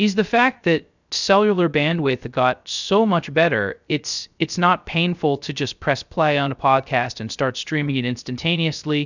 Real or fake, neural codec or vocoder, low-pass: fake; codec, 16 kHz, 0.3 kbps, FocalCodec; 7.2 kHz